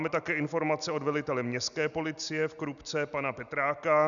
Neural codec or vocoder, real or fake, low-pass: none; real; 7.2 kHz